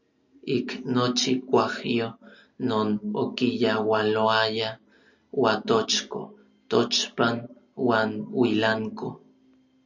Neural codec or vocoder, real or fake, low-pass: none; real; 7.2 kHz